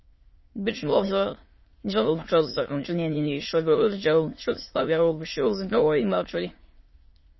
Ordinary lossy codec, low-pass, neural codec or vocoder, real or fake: MP3, 24 kbps; 7.2 kHz; autoencoder, 22.05 kHz, a latent of 192 numbers a frame, VITS, trained on many speakers; fake